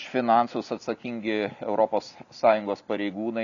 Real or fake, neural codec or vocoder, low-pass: real; none; 7.2 kHz